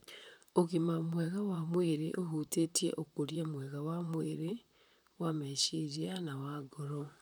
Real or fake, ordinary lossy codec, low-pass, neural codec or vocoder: fake; none; none; vocoder, 44.1 kHz, 128 mel bands, Pupu-Vocoder